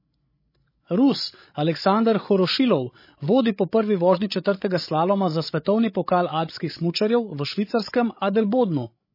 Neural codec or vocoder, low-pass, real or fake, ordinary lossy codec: codec, 16 kHz, 16 kbps, FreqCodec, larger model; 5.4 kHz; fake; MP3, 24 kbps